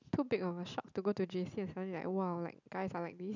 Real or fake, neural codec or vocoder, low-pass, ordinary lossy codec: real; none; 7.2 kHz; none